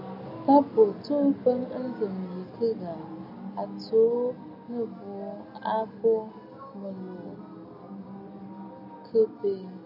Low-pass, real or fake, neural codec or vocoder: 5.4 kHz; real; none